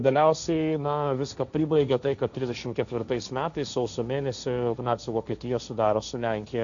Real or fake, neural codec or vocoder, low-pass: fake; codec, 16 kHz, 1.1 kbps, Voila-Tokenizer; 7.2 kHz